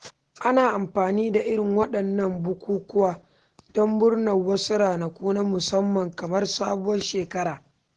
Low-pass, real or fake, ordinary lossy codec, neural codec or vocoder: 10.8 kHz; real; Opus, 16 kbps; none